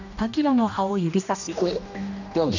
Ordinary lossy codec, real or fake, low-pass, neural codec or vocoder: none; fake; 7.2 kHz; codec, 16 kHz, 1 kbps, X-Codec, HuBERT features, trained on general audio